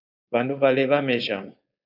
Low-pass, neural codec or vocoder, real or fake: 5.4 kHz; codec, 16 kHz, 4.8 kbps, FACodec; fake